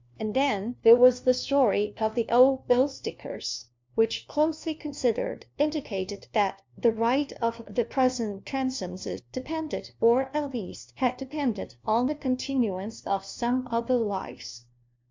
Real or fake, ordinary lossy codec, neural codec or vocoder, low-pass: fake; MP3, 64 kbps; codec, 16 kHz, 1 kbps, FunCodec, trained on LibriTTS, 50 frames a second; 7.2 kHz